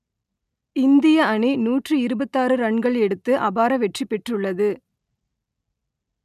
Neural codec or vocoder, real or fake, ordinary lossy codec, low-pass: none; real; none; 14.4 kHz